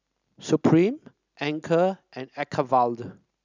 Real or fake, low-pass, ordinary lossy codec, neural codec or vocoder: real; 7.2 kHz; none; none